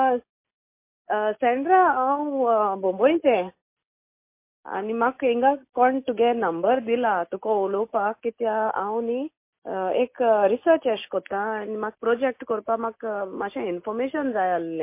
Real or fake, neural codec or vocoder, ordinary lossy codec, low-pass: real; none; MP3, 24 kbps; 3.6 kHz